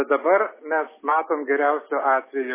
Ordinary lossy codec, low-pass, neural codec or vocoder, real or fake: MP3, 16 kbps; 3.6 kHz; none; real